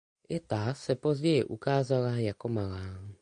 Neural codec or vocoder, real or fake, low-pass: none; real; 9.9 kHz